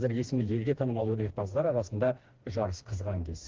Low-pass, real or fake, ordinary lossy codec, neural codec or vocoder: 7.2 kHz; fake; Opus, 16 kbps; codec, 16 kHz, 2 kbps, FreqCodec, smaller model